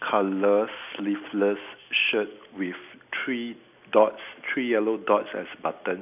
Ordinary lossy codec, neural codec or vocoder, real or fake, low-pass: none; none; real; 3.6 kHz